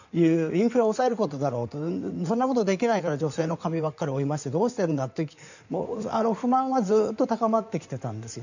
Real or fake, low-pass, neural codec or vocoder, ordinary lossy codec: fake; 7.2 kHz; codec, 16 kHz in and 24 kHz out, 2.2 kbps, FireRedTTS-2 codec; none